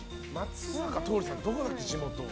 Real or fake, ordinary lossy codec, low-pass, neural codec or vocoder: real; none; none; none